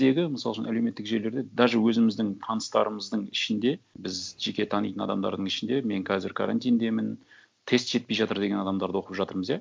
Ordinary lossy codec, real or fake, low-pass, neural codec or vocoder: none; real; none; none